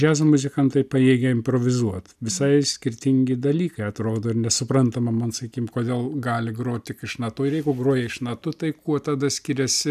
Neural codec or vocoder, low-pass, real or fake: none; 14.4 kHz; real